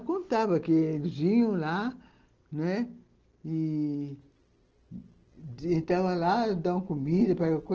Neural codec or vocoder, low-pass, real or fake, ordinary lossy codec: none; 7.2 kHz; real; Opus, 16 kbps